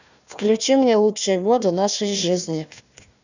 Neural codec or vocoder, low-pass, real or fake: codec, 16 kHz, 1 kbps, FunCodec, trained on Chinese and English, 50 frames a second; 7.2 kHz; fake